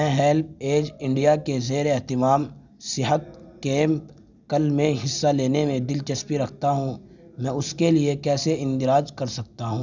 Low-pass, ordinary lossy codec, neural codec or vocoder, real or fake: 7.2 kHz; none; none; real